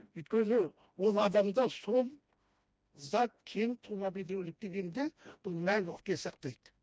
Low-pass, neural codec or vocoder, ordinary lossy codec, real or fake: none; codec, 16 kHz, 1 kbps, FreqCodec, smaller model; none; fake